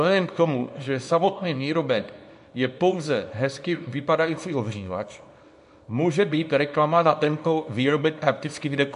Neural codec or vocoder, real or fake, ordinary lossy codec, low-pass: codec, 24 kHz, 0.9 kbps, WavTokenizer, small release; fake; MP3, 64 kbps; 10.8 kHz